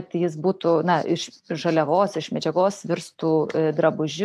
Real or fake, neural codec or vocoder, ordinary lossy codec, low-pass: real; none; AAC, 96 kbps; 14.4 kHz